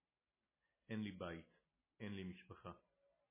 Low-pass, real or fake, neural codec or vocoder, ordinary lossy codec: 3.6 kHz; real; none; MP3, 16 kbps